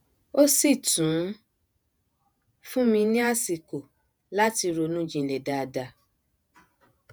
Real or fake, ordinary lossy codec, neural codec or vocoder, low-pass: fake; none; vocoder, 48 kHz, 128 mel bands, Vocos; none